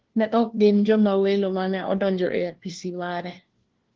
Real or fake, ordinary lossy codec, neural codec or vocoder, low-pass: fake; Opus, 16 kbps; codec, 16 kHz, 1 kbps, FunCodec, trained on LibriTTS, 50 frames a second; 7.2 kHz